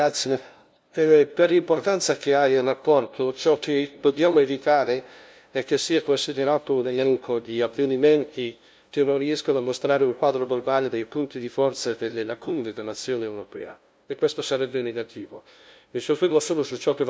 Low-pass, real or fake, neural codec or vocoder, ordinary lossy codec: none; fake; codec, 16 kHz, 0.5 kbps, FunCodec, trained on LibriTTS, 25 frames a second; none